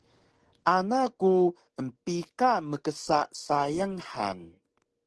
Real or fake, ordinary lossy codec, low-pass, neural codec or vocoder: fake; Opus, 16 kbps; 10.8 kHz; codec, 44.1 kHz, 7.8 kbps, DAC